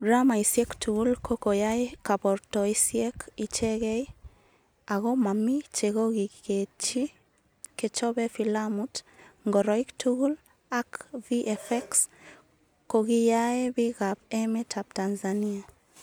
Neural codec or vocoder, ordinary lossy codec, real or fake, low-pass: none; none; real; none